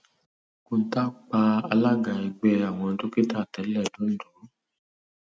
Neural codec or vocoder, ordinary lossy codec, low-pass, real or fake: none; none; none; real